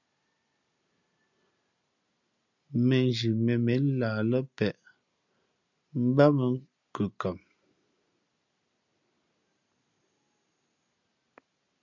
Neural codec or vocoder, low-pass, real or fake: none; 7.2 kHz; real